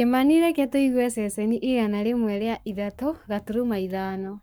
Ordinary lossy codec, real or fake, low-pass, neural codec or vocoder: none; fake; none; codec, 44.1 kHz, 7.8 kbps, Pupu-Codec